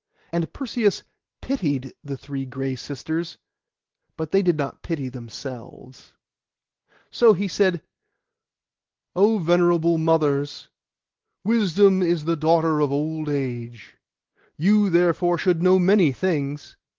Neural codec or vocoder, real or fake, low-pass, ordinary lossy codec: none; real; 7.2 kHz; Opus, 16 kbps